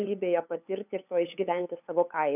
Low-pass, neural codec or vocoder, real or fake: 3.6 kHz; codec, 16 kHz, 8 kbps, FunCodec, trained on LibriTTS, 25 frames a second; fake